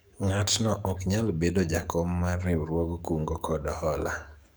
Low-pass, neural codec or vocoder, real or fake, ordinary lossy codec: none; codec, 44.1 kHz, 7.8 kbps, DAC; fake; none